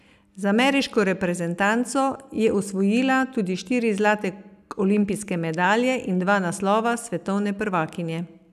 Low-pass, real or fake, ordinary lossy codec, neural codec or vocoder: 14.4 kHz; real; none; none